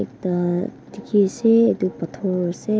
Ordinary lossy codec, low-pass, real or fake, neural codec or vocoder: none; none; real; none